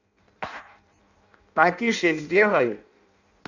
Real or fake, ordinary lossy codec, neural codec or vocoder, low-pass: fake; none; codec, 16 kHz in and 24 kHz out, 0.6 kbps, FireRedTTS-2 codec; 7.2 kHz